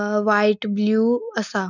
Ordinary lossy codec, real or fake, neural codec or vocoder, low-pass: none; real; none; 7.2 kHz